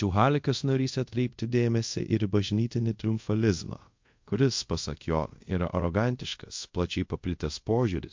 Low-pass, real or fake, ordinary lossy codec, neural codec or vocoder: 7.2 kHz; fake; MP3, 48 kbps; codec, 24 kHz, 0.5 kbps, DualCodec